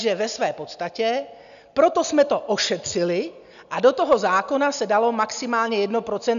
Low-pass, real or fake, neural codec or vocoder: 7.2 kHz; real; none